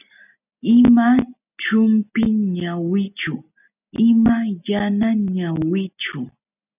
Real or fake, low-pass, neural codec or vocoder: real; 3.6 kHz; none